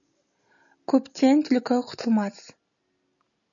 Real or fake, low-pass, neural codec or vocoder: real; 7.2 kHz; none